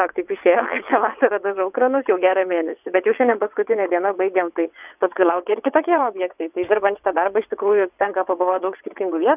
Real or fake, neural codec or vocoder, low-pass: fake; vocoder, 22.05 kHz, 80 mel bands, WaveNeXt; 3.6 kHz